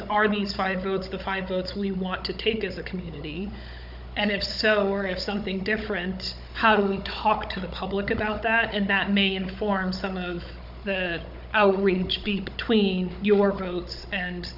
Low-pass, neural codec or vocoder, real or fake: 5.4 kHz; codec, 16 kHz, 16 kbps, FunCodec, trained on Chinese and English, 50 frames a second; fake